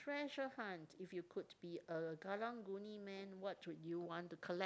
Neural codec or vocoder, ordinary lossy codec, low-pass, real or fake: none; none; none; real